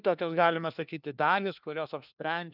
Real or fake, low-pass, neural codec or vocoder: fake; 5.4 kHz; codec, 16 kHz, 1 kbps, FunCodec, trained on LibriTTS, 50 frames a second